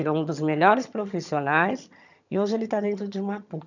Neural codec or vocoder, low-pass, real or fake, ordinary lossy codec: vocoder, 22.05 kHz, 80 mel bands, HiFi-GAN; 7.2 kHz; fake; none